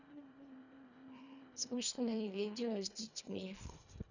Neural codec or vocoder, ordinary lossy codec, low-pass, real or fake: codec, 24 kHz, 1.5 kbps, HILCodec; none; 7.2 kHz; fake